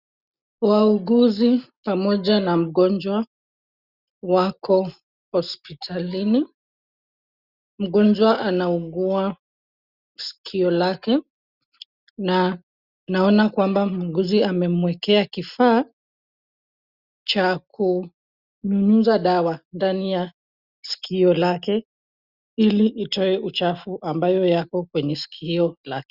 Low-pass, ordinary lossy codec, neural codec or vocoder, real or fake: 5.4 kHz; Opus, 64 kbps; none; real